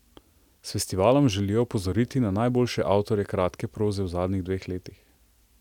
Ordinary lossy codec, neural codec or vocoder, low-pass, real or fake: none; none; 19.8 kHz; real